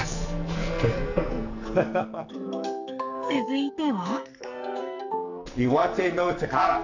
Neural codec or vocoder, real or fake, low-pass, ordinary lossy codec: codec, 44.1 kHz, 2.6 kbps, SNAC; fake; 7.2 kHz; none